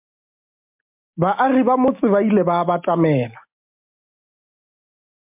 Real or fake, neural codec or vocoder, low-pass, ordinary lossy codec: real; none; 3.6 kHz; MP3, 32 kbps